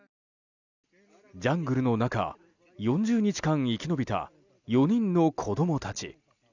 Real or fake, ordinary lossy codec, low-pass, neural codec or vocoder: real; none; 7.2 kHz; none